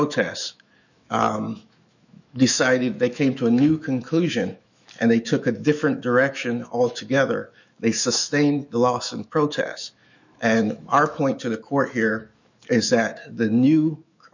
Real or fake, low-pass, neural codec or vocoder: fake; 7.2 kHz; autoencoder, 48 kHz, 128 numbers a frame, DAC-VAE, trained on Japanese speech